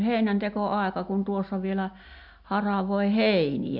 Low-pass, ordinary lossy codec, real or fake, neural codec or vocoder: 5.4 kHz; MP3, 48 kbps; real; none